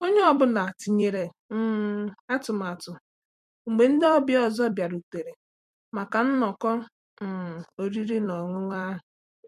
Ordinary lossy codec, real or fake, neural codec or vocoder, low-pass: MP3, 64 kbps; fake; vocoder, 44.1 kHz, 128 mel bands every 256 samples, BigVGAN v2; 14.4 kHz